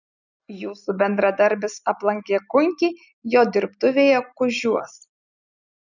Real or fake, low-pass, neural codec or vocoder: real; 7.2 kHz; none